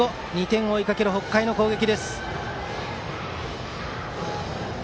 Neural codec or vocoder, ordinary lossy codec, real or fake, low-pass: none; none; real; none